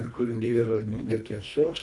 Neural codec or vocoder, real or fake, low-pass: codec, 24 kHz, 1.5 kbps, HILCodec; fake; 10.8 kHz